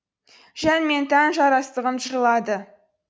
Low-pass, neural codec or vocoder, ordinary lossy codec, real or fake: none; none; none; real